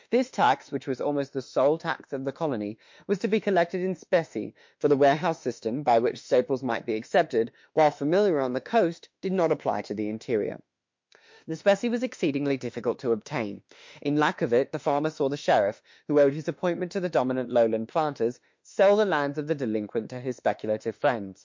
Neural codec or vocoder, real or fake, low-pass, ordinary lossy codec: autoencoder, 48 kHz, 32 numbers a frame, DAC-VAE, trained on Japanese speech; fake; 7.2 kHz; MP3, 48 kbps